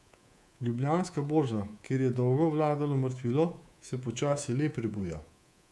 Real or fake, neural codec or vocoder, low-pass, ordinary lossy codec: fake; codec, 24 kHz, 3.1 kbps, DualCodec; none; none